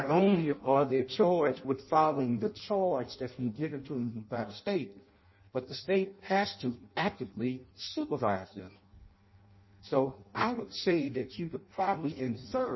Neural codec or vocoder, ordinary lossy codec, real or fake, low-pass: codec, 16 kHz in and 24 kHz out, 0.6 kbps, FireRedTTS-2 codec; MP3, 24 kbps; fake; 7.2 kHz